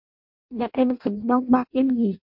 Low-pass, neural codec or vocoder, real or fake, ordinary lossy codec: 5.4 kHz; codec, 16 kHz in and 24 kHz out, 0.6 kbps, FireRedTTS-2 codec; fake; MP3, 48 kbps